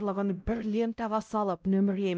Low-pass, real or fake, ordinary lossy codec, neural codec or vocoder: none; fake; none; codec, 16 kHz, 0.5 kbps, X-Codec, WavLM features, trained on Multilingual LibriSpeech